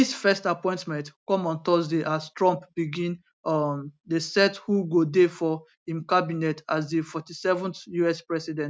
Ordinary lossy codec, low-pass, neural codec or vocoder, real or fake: none; none; none; real